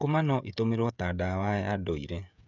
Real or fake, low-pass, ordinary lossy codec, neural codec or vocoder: fake; 7.2 kHz; none; codec, 16 kHz, 16 kbps, FreqCodec, smaller model